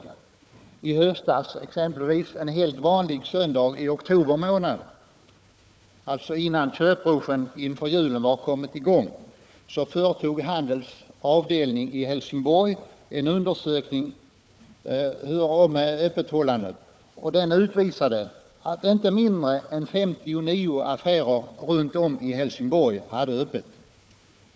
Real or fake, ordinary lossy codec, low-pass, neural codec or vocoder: fake; none; none; codec, 16 kHz, 4 kbps, FunCodec, trained on Chinese and English, 50 frames a second